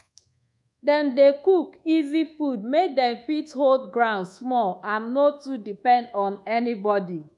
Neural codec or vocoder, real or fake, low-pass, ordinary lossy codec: codec, 24 kHz, 1.2 kbps, DualCodec; fake; 10.8 kHz; none